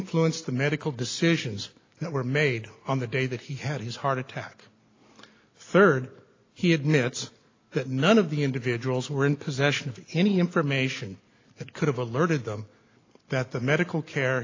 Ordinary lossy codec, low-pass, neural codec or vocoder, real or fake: AAC, 32 kbps; 7.2 kHz; none; real